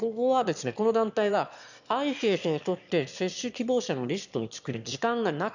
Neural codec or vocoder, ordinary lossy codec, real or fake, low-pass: autoencoder, 22.05 kHz, a latent of 192 numbers a frame, VITS, trained on one speaker; none; fake; 7.2 kHz